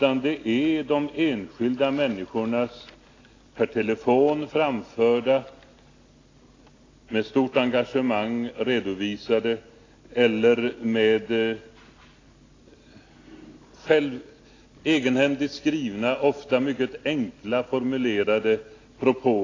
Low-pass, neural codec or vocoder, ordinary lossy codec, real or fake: 7.2 kHz; none; AAC, 32 kbps; real